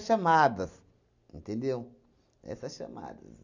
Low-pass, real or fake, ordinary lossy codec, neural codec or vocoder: 7.2 kHz; real; none; none